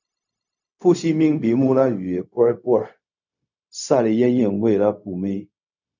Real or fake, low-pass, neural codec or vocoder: fake; 7.2 kHz; codec, 16 kHz, 0.4 kbps, LongCat-Audio-Codec